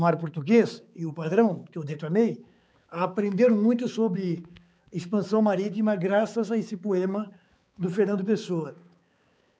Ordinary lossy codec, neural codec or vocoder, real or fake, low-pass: none; codec, 16 kHz, 4 kbps, X-Codec, HuBERT features, trained on balanced general audio; fake; none